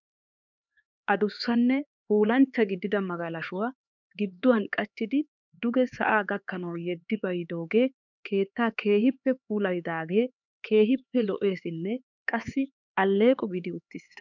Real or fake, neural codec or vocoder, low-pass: fake; codec, 16 kHz, 4 kbps, X-Codec, HuBERT features, trained on LibriSpeech; 7.2 kHz